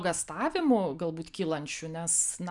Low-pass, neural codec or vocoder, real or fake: 10.8 kHz; none; real